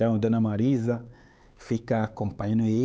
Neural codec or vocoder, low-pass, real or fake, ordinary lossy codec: codec, 16 kHz, 4 kbps, X-Codec, HuBERT features, trained on LibriSpeech; none; fake; none